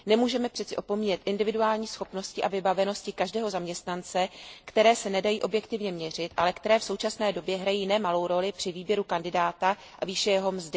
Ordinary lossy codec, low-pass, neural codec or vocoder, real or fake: none; none; none; real